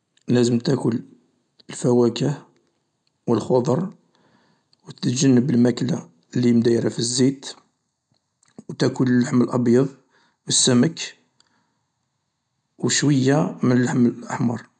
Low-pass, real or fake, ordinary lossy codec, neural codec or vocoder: 9.9 kHz; real; none; none